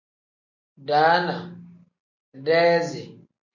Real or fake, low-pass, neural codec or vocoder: real; 7.2 kHz; none